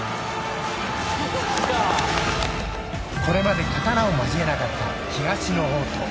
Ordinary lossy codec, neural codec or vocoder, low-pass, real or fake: none; none; none; real